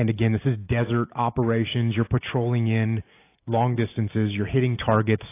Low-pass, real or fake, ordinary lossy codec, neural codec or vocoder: 3.6 kHz; real; AAC, 24 kbps; none